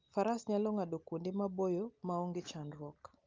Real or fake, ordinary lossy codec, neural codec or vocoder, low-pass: real; Opus, 32 kbps; none; 7.2 kHz